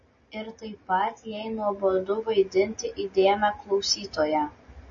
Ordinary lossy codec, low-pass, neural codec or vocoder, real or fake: MP3, 32 kbps; 7.2 kHz; none; real